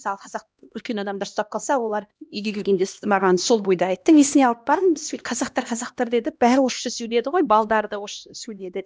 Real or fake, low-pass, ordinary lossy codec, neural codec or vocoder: fake; none; none; codec, 16 kHz, 1 kbps, X-Codec, HuBERT features, trained on LibriSpeech